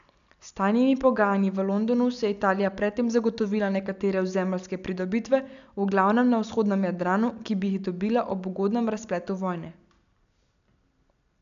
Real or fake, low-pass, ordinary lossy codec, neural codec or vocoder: real; 7.2 kHz; none; none